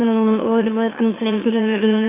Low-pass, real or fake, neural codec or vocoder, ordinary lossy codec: 3.6 kHz; fake; autoencoder, 44.1 kHz, a latent of 192 numbers a frame, MeloTTS; AAC, 16 kbps